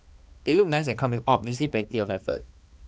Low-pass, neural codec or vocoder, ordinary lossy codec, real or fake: none; codec, 16 kHz, 2 kbps, X-Codec, HuBERT features, trained on balanced general audio; none; fake